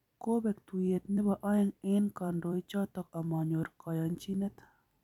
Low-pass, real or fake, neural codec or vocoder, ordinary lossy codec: 19.8 kHz; fake; vocoder, 44.1 kHz, 128 mel bands every 256 samples, BigVGAN v2; none